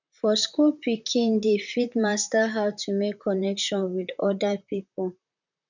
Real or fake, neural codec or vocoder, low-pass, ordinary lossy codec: fake; vocoder, 44.1 kHz, 128 mel bands, Pupu-Vocoder; 7.2 kHz; none